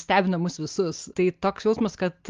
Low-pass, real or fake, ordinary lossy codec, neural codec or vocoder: 7.2 kHz; real; Opus, 32 kbps; none